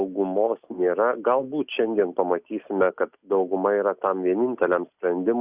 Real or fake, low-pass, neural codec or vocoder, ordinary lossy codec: real; 3.6 kHz; none; Opus, 64 kbps